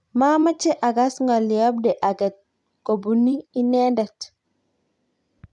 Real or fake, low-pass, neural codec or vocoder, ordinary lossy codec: fake; 10.8 kHz; vocoder, 44.1 kHz, 128 mel bands every 256 samples, BigVGAN v2; none